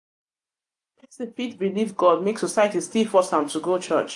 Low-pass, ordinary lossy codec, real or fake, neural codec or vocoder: 10.8 kHz; AAC, 64 kbps; real; none